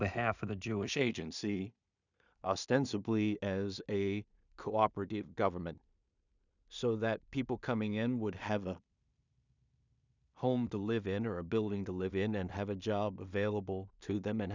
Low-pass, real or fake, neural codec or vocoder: 7.2 kHz; fake; codec, 16 kHz in and 24 kHz out, 0.4 kbps, LongCat-Audio-Codec, two codebook decoder